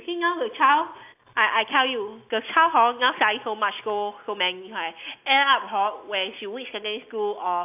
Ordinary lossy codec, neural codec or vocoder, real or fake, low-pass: AAC, 32 kbps; none; real; 3.6 kHz